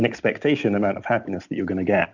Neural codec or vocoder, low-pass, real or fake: none; 7.2 kHz; real